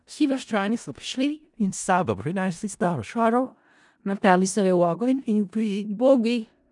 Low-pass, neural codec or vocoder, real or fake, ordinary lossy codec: 10.8 kHz; codec, 16 kHz in and 24 kHz out, 0.4 kbps, LongCat-Audio-Codec, four codebook decoder; fake; none